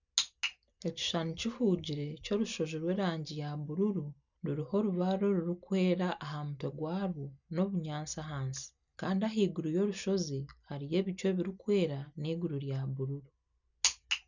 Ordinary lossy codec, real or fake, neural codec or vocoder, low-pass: none; real; none; 7.2 kHz